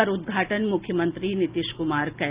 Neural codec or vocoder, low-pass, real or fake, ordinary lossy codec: none; 3.6 kHz; real; Opus, 32 kbps